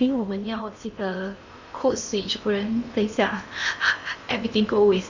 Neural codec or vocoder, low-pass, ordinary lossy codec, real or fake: codec, 16 kHz in and 24 kHz out, 0.8 kbps, FocalCodec, streaming, 65536 codes; 7.2 kHz; none; fake